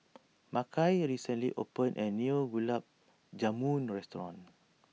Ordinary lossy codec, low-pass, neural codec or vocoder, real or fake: none; none; none; real